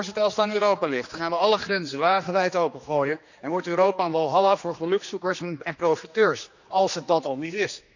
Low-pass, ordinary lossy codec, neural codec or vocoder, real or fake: 7.2 kHz; none; codec, 16 kHz, 2 kbps, X-Codec, HuBERT features, trained on general audio; fake